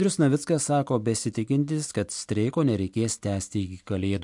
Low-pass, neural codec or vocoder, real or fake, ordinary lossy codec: 10.8 kHz; none; real; MP3, 64 kbps